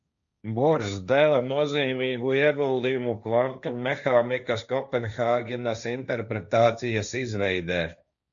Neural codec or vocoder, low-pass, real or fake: codec, 16 kHz, 1.1 kbps, Voila-Tokenizer; 7.2 kHz; fake